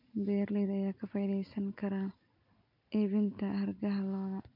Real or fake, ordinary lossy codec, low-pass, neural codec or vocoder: real; none; 5.4 kHz; none